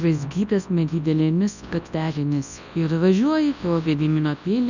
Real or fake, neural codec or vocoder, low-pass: fake; codec, 24 kHz, 0.9 kbps, WavTokenizer, large speech release; 7.2 kHz